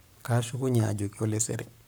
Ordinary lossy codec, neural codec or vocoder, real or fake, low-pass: none; codec, 44.1 kHz, 7.8 kbps, Pupu-Codec; fake; none